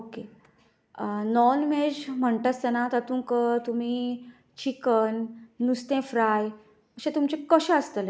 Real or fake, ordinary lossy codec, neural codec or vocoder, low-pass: real; none; none; none